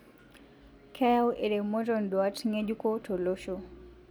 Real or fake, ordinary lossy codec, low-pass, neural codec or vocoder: real; none; none; none